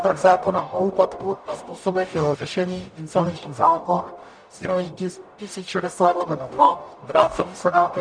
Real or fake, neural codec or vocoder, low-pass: fake; codec, 44.1 kHz, 0.9 kbps, DAC; 9.9 kHz